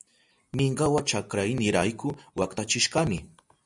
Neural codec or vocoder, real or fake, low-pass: none; real; 10.8 kHz